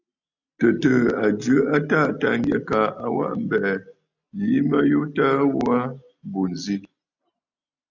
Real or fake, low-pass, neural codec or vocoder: real; 7.2 kHz; none